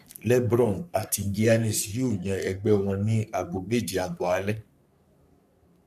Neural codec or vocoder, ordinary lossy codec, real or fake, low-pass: codec, 44.1 kHz, 3.4 kbps, Pupu-Codec; none; fake; 14.4 kHz